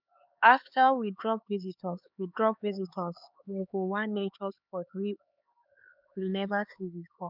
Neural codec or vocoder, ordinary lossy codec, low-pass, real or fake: codec, 16 kHz, 4 kbps, X-Codec, HuBERT features, trained on LibriSpeech; none; 5.4 kHz; fake